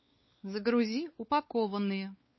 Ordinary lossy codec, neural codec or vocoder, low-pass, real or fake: MP3, 24 kbps; codec, 16 kHz, 4 kbps, X-Codec, WavLM features, trained on Multilingual LibriSpeech; 7.2 kHz; fake